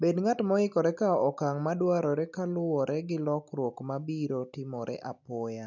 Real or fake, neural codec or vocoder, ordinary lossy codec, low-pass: real; none; none; 7.2 kHz